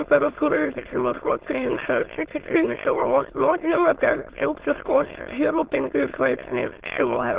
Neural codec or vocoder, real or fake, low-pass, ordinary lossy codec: autoencoder, 22.05 kHz, a latent of 192 numbers a frame, VITS, trained on many speakers; fake; 3.6 kHz; Opus, 16 kbps